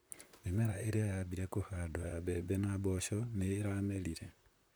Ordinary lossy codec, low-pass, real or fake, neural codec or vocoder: none; none; fake; vocoder, 44.1 kHz, 128 mel bands, Pupu-Vocoder